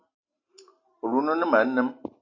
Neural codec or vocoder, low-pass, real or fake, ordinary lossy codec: none; 7.2 kHz; real; AAC, 32 kbps